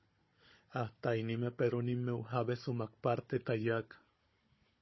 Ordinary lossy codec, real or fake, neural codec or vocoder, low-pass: MP3, 24 kbps; fake; codec, 16 kHz, 16 kbps, FunCodec, trained on Chinese and English, 50 frames a second; 7.2 kHz